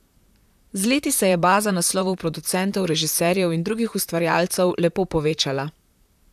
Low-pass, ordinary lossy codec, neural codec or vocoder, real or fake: 14.4 kHz; none; vocoder, 44.1 kHz, 128 mel bands, Pupu-Vocoder; fake